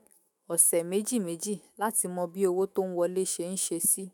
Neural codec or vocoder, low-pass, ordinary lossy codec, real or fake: autoencoder, 48 kHz, 128 numbers a frame, DAC-VAE, trained on Japanese speech; none; none; fake